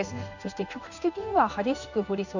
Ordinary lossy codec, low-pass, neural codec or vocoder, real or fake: none; 7.2 kHz; codec, 16 kHz in and 24 kHz out, 1 kbps, XY-Tokenizer; fake